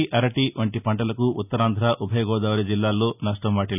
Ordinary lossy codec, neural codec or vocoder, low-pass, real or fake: none; none; 3.6 kHz; real